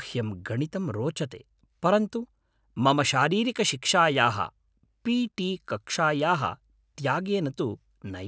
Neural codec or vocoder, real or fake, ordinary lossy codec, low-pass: none; real; none; none